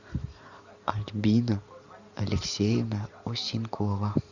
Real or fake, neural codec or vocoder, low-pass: fake; vocoder, 44.1 kHz, 128 mel bands every 512 samples, BigVGAN v2; 7.2 kHz